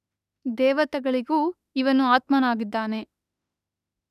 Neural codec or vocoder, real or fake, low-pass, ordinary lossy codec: autoencoder, 48 kHz, 32 numbers a frame, DAC-VAE, trained on Japanese speech; fake; 14.4 kHz; none